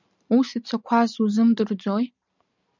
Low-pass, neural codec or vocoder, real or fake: 7.2 kHz; none; real